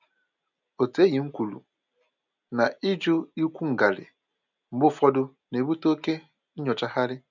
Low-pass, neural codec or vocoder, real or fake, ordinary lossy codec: 7.2 kHz; none; real; none